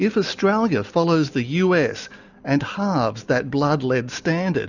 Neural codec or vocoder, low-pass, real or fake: none; 7.2 kHz; real